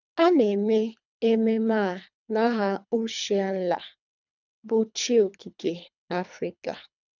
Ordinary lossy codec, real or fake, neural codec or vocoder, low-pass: none; fake; codec, 24 kHz, 3 kbps, HILCodec; 7.2 kHz